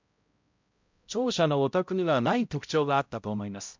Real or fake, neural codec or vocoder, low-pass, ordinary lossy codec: fake; codec, 16 kHz, 0.5 kbps, X-Codec, HuBERT features, trained on balanced general audio; 7.2 kHz; MP3, 64 kbps